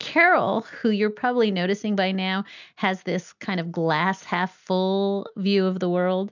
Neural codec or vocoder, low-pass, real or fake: none; 7.2 kHz; real